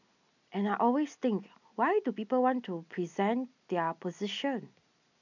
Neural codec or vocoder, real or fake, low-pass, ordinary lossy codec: none; real; 7.2 kHz; none